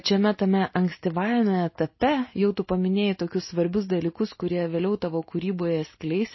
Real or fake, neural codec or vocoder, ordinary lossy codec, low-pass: real; none; MP3, 24 kbps; 7.2 kHz